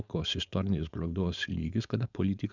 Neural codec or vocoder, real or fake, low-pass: codec, 16 kHz, 4.8 kbps, FACodec; fake; 7.2 kHz